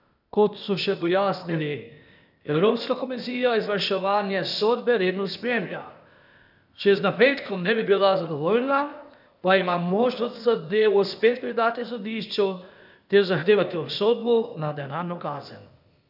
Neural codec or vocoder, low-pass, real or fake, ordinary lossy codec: codec, 16 kHz, 0.8 kbps, ZipCodec; 5.4 kHz; fake; none